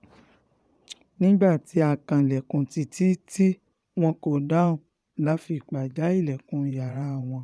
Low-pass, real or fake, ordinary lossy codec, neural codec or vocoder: none; fake; none; vocoder, 22.05 kHz, 80 mel bands, Vocos